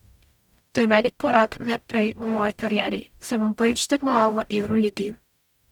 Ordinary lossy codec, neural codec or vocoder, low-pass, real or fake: none; codec, 44.1 kHz, 0.9 kbps, DAC; 19.8 kHz; fake